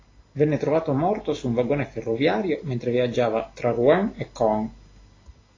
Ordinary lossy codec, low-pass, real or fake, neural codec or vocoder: AAC, 32 kbps; 7.2 kHz; real; none